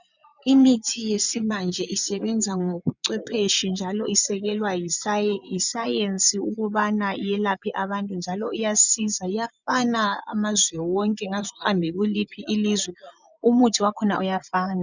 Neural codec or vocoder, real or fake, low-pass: vocoder, 24 kHz, 100 mel bands, Vocos; fake; 7.2 kHz